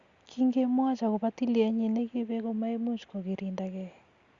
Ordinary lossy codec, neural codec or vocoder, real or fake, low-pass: Opus, 64 kbps; none; real; 7.2 kHz